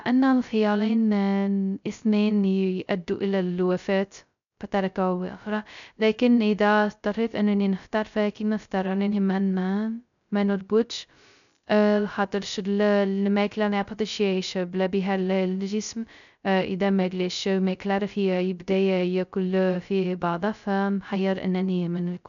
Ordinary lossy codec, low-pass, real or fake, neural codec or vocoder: none; 7.2 kHz; fake; codec, 16 kHz, 0.2 kbps, FocalCodec